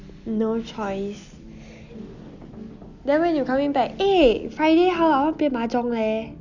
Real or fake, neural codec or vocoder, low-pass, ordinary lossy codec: real; none; 7.2 kHz; none